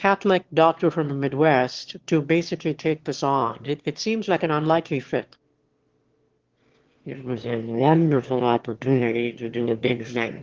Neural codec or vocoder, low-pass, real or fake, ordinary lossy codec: autoencoder, 22.05 kHz, a latent of 192 numbers a frame, VITS, trained on one speaker; 7.2 kHz; fake; Opus, 16 kbps